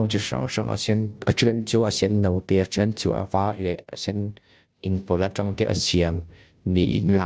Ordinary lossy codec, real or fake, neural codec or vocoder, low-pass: none; fake; codec, 16 kHz, 0.5 kbps, FunCodec, trained on Chinese and English, 25 frames a second; none